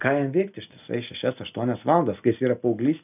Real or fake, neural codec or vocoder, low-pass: real; none; 3.6 kHz